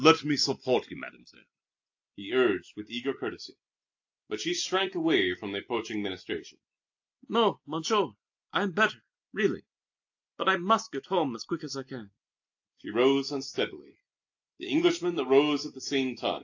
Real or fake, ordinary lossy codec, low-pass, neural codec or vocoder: real; AAC, 48 kbps; 7.2 kHz; none